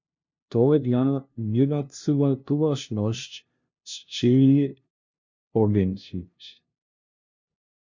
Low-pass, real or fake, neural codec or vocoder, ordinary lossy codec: 7.2 kHz; fake; codec, 16 kHz, 0.5 kbps, FunCodec, trained on LibriTTS, 25 frames a second; MP3, 48 kbps